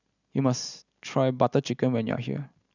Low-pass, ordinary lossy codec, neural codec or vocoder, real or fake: 7.2 kHz; none; none; real